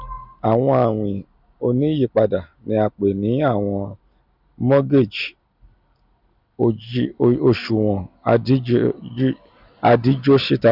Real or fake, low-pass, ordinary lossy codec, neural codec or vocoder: real; 5.4 kHz; none; none